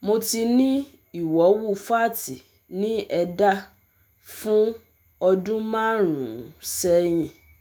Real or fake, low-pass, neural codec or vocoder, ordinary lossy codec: fake; none; autoencoder, 48 kHz, 128 numbers a frame, DAC-VAE, trained on Japanese speech; none